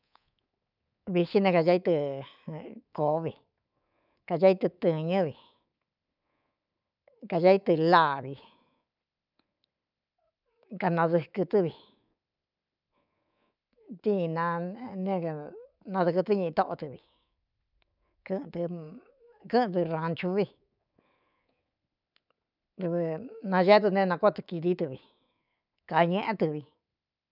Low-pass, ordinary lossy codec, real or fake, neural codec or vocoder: 5.4 kHz; none; fake; codec, 24 kHz, 3.1 kbps, DualCodec